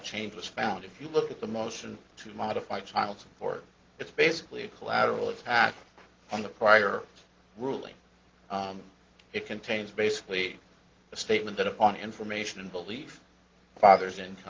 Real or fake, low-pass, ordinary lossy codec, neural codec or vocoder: real; 7.2 kHz; Opus, 16 kbps; none